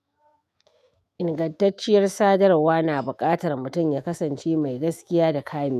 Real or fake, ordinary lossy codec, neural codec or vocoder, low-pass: fake; none; autoencoder, 48 kHz, 128 numbers a frame, DAC-VAE, trained on Japanese speech; 14.4 kHz